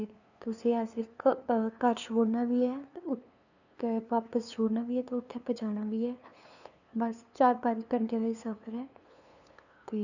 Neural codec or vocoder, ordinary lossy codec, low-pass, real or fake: codec, 16 kHz, 2 kbps, FunCodec, trained on LibriTTS, 25 frames a second; none; 7.2 kHz; fake